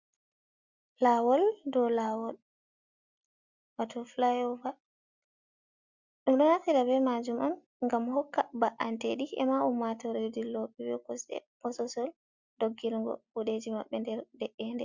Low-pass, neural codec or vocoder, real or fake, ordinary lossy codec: 7.2 kHz; none; real; Opus, 64 kbps